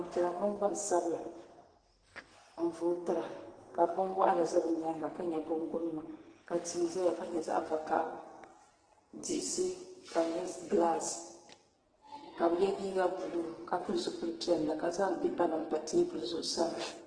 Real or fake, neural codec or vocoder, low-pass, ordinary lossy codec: fake; codec, 44.1 kHz, 2.6 kbps, SNAC; 9.9 kHz; Opus, 16 kbps